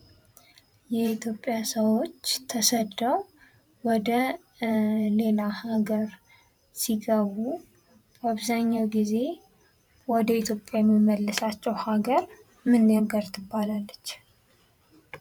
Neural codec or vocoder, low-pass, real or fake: vocoder, 44.1 kHz, 128 mel bands every 512 samples, BigVGAN v2; 19.8 kHz; fake